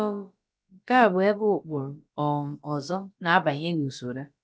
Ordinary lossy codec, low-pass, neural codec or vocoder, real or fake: none; none; codec, 16 kHz, about 1 kbps, DyCAST, with the encoder's durations; fake